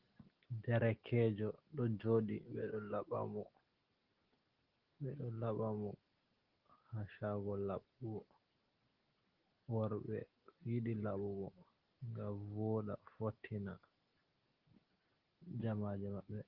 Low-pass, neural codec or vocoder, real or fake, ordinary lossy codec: 5.4 kHz; none; real; Opus, 16 kbps